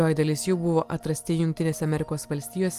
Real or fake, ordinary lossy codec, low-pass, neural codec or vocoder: real; Opus, 32 kbps; 14.4 kHz; none